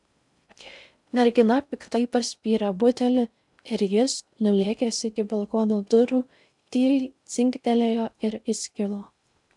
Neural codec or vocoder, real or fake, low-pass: codec, 16 kHz in and 24 kHz out, 0.6 kbps, FocalCodec, streaming, 4096 codes; fake; 10.8 kHz